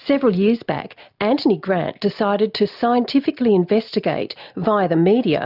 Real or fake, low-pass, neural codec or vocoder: real; 5.4 kHz; none